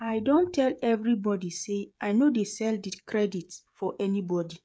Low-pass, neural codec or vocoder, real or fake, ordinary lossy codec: none; codec, 16 kHz, 16 kbps, FreqCodec, smaller model; fake; none